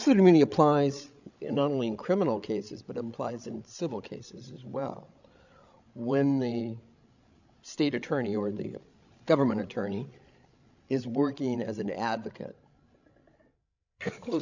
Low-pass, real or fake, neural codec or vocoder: 7.2 kHz; fake; codec, 16 kHz, 16 kbps, FreqCodec, larger model